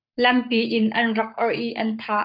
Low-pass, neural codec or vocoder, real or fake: 5.4 kHz; codec, 44.1 kHz, 7.8 kbps, Pupu-Codec; fake